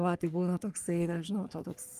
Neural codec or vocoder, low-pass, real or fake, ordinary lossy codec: codec, 44.1 kHz, 3.4 kbps, Pupu-Codec; 14.4 kHz; fake; Opus, 32 kbps